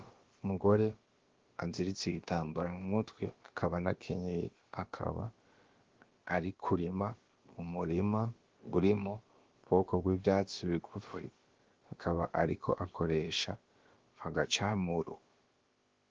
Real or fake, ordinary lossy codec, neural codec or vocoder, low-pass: fake; Opus, 16 kbps; codec, 16 kHz, about 1 kbps, DyCAST, with the encoder's durations; 7.2 kHz